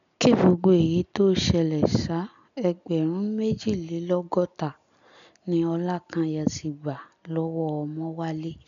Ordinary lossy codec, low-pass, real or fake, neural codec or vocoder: none; 7.2 kHz; real; none